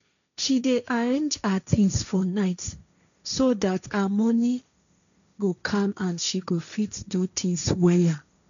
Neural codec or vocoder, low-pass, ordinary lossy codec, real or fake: codec, 16 kHz, 1.1 kbps, Voila-Tokenizer; 7.2 kHz; none; fake